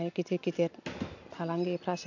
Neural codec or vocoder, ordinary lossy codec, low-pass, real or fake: none; none; 7.2 kHz; real